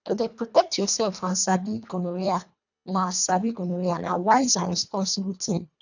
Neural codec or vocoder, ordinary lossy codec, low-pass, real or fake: codec, 24 kHz, 1.5 kbps, HILCodec; none; 7.2 kHz; fake